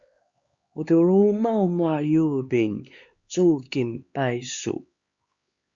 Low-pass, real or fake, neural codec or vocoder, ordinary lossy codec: 7.2 kHz; fake; codec, 16 kHz, 4 kbps, X-Codec, HuBERT features, trained on LibriSpeech; Opus, 64 kbps